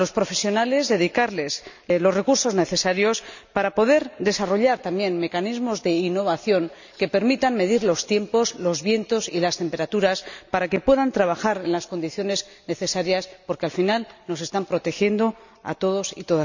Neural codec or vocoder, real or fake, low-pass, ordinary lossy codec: none; real; 7.2 kHz; none